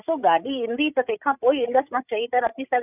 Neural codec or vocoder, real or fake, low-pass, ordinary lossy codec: codec, 16 kHz, 16 kbps, FreqCodec, larger model; fake; 3.6 kHz; none